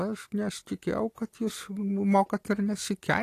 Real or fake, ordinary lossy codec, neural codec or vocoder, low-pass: real; AAC, 48 kbps; none; 14.4 kHz